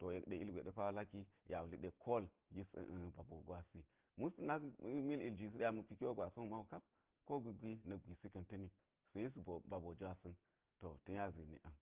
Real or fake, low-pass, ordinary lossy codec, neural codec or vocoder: real; 3.6 kHz; Opus, 16 kbps; none